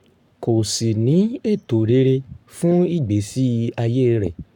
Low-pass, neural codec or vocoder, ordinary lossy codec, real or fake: 19.8 kHz; codec, 44.1 kHz, 7.8 kbps, Pupu-Codec; none; fake